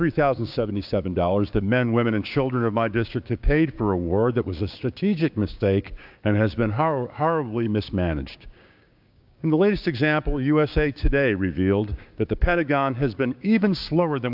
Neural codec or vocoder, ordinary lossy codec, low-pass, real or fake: codec, 16 kHz, 6 kbps, DAC; AAC, 48 kbps; 5.4 kHz; fake